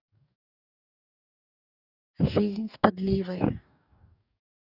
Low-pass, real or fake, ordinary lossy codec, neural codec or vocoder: 5.4 kHz; fake; none; codec, 44.1 kHz, 2.6 kbps, DAC